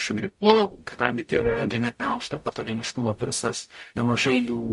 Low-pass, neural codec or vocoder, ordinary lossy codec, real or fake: 14.4 kHz; codec, 44.1 kHz, 0.9 kbps, DAC; MP3, 48 kbps; fake